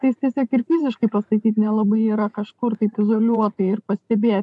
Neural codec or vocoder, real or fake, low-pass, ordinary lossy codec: vocoder, 44.1 kHz, 128 mel bands every 512 samples, BigVGAN v2; fake; 10.8 kHz; MP3, 96 kbps